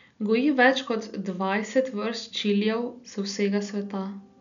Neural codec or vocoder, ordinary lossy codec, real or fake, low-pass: none; none; real; 7.2 kHz